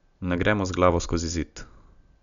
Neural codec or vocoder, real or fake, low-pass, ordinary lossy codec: none; real; 7.2 kHz; none